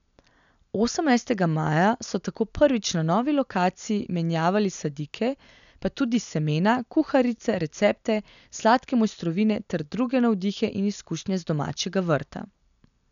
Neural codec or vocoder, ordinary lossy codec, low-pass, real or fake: none; none; 7.2 kHz; real